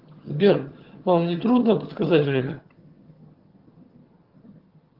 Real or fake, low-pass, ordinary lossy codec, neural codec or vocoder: fake; 5.4 kHz; Opus, 16 kbps; vocoder, 22.05 kHz, 80 mel bands, HiFi-GAN